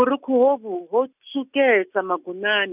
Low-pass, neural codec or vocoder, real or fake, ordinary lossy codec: 3.6 kHz; none; real; none